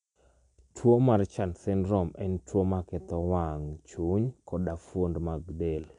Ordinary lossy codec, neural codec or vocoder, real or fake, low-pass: none; none; real; 10.8 kHz